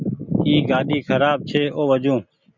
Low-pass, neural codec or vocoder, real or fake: 7.2 kHz; none; real